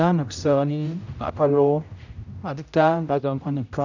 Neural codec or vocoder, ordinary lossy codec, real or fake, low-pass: codec, 16 kHz, 0.5 kbps, X-Codec, HuBERT features, trained on general audio; none; fake; 7.2 kHz